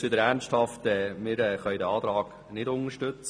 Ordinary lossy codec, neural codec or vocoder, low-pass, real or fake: none; none; 9.9 kHz; real